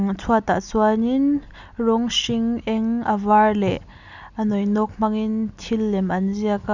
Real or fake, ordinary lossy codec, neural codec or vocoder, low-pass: real; none; none; 7.2 kHz